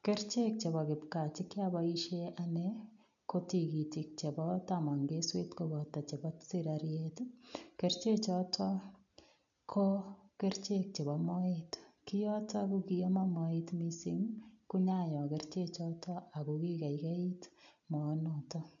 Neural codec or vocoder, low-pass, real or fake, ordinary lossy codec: none; 7.2 kHz; real; none